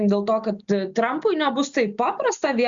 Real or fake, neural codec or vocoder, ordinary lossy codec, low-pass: real; none; Opus, 64 kbps; 7.2 kHz